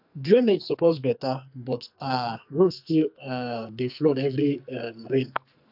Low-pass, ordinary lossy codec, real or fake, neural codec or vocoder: 5.4 kHz; AAC, 48 kbps; fake; codec, 44.1 kHz, 2.6 kbps, SNAC